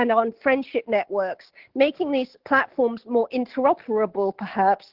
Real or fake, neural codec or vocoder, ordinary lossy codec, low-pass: real; none; Opus, 16 kbps; 5.4 kHz